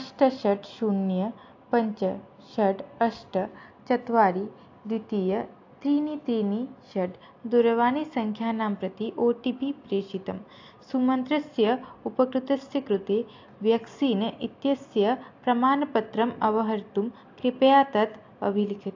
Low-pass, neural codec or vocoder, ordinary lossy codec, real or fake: 7.2 kHz; none; AAC, 48 kbps; real